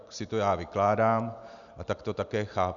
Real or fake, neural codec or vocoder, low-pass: real; none; 7.2 kHz